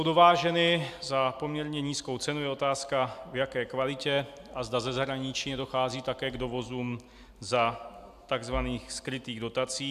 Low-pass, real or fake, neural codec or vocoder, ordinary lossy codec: 14.4 kHz; real; none; AAC, 96 kbps